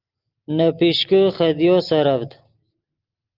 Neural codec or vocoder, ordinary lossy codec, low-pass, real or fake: none; Opus, 32 kbps; 5.4 kHz; real